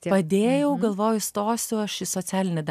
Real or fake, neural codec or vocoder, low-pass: real; none; 14.4 kHz